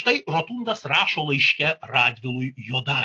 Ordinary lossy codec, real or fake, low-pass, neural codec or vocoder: AAC, 48 kbps; real; 10.8 kHz; none